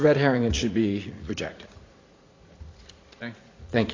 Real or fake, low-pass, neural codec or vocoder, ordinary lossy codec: fake; 7.2 kHz; vocoder, 44.1 kHz, 80 mel bands, Vocos; AAC, 32 kbps